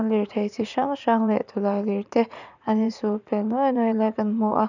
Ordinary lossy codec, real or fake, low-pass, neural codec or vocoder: none; fake; 7.2 kHz; vocoder, 44.1 kHz, 80 mel bands, Vocos